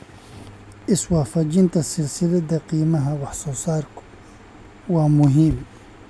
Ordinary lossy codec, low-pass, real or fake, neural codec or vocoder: none; none; real; none